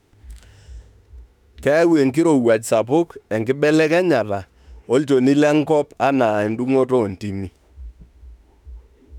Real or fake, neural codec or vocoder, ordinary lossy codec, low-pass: fake; autoencoder, 48 kHz, 32 numbers a frame, DAC-VAE, trained on Japanese speech; none; 19.8 kHz